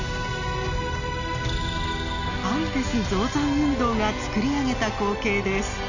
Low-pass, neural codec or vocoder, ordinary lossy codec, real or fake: 7.2 kHz; none; none; real